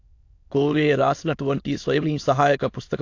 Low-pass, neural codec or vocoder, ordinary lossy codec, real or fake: 7.2 kHz; autoencoder, 22.05 kHz, a latent of 192 numbers a frame, VITS, trained on many speakers; AAC, 48 kbps; fake